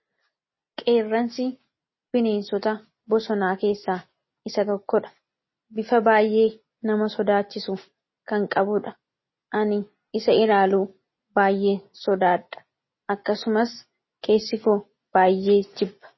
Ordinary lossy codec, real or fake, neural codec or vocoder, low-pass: MP3, 24 kbps; real; none; 7.2 kHz